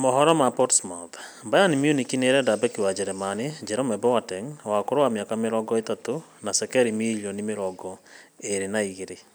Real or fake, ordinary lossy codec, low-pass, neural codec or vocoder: real; none; none; none